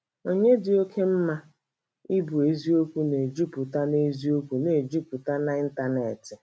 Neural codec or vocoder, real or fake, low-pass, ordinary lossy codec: none; real; none; none